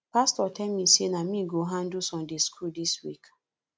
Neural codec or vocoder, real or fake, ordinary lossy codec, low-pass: none; real; none; none